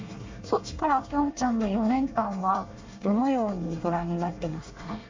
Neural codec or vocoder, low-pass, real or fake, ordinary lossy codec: codec, 24 kHz, 1 kbps, SNAC; 7.2 kHz; fake; MP3, 64 kbps